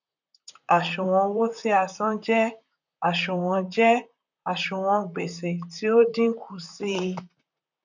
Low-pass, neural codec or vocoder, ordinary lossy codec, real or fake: 7.2 kHz; vocoder, 44.1 kHz, 128 mel bands, Pupu-Vocoder; none; fake